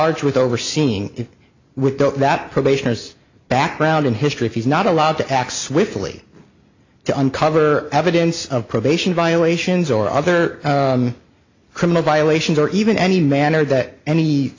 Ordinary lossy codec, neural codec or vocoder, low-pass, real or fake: AAC, 48 kbps; none; 7.2 kHz; real